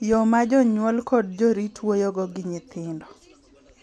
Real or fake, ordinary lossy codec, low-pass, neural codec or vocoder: real; none; none; none